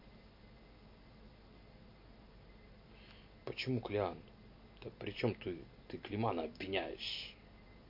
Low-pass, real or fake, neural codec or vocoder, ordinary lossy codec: 5.4 kHz; real; none; MP3, 32 kbps